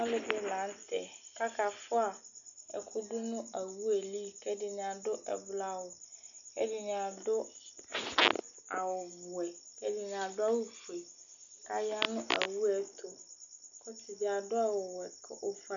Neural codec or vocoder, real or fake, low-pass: none; real; 7.2 kHz